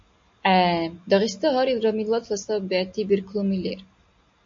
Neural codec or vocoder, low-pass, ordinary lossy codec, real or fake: none; 7.2 kHz; MP3, 48 kbps; real